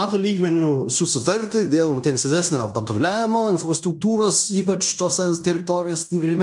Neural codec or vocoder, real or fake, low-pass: codec, 16 kHz in and 24 kHz out, 0.9 kbps, LongCat-Audio-Codec, fine tuned four codebook decoder; fake; 10.8 kHz